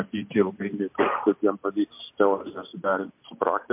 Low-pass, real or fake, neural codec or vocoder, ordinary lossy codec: 3.6 kHz; real; none; MP3, 32 kbps